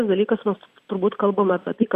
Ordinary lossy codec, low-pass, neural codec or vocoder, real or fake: Opus, 64 kbps; 14.4 kHz; none; real